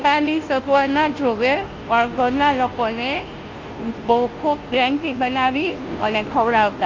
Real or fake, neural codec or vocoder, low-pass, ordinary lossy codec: fake; codec, 16 kHz, 0.5 kbps, FunCodec, trained on Chinese and English, 25 frames a second; 7.2 kHz; Opus, 32 kbps